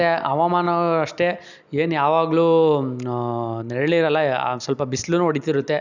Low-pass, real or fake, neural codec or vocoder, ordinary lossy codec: 7.2 kHz; real; none; none